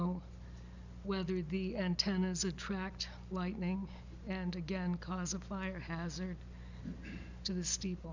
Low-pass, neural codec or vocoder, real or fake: 7.2 kHz; none; real